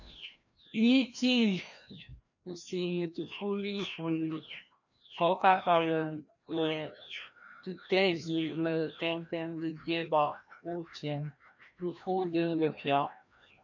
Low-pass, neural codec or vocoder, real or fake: 7.2 kHz; codec, 16 kHz, 1 kbps, FreqCodec, larger model; fake